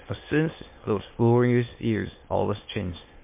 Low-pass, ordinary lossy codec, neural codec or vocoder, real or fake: 3.6 kHz; MP3, 24 kbps; autoencoder, 22.05 kHz, a latent of 192 numbers a frame, VITS, trained on many speakers; fake